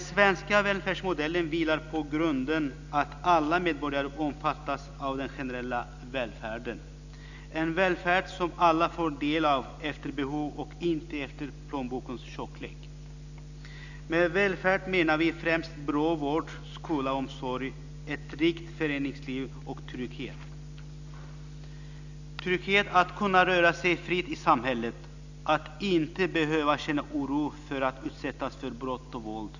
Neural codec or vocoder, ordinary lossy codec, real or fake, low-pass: none; none; real; 7.2 kHz